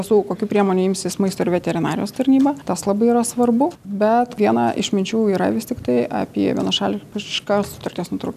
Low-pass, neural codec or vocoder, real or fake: 14.4 kHz; none; real